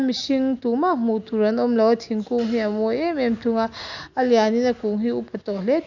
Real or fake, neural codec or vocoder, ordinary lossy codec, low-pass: real; none; none; 7.2 kHz